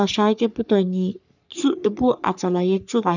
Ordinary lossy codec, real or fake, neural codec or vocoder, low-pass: none; fake; codec, 44.1 kHz, 3.4 kbps, Pupu-Codec; 7.2 kHz